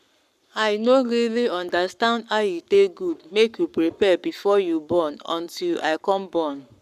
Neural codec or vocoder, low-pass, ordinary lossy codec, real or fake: codec, 44.1 kHz, 7.8 kbps, Pupu-Codec; 14.4 kHz; none; fake